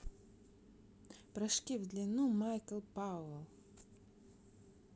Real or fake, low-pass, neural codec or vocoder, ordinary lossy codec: real; none; none; none